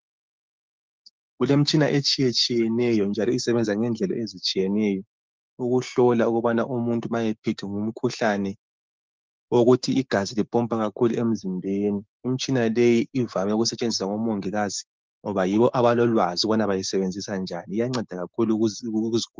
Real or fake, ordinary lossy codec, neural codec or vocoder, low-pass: fake; Opus, 32 kbps; codec, 16 kHz, 6 kbps, DAC; 7.2 kHz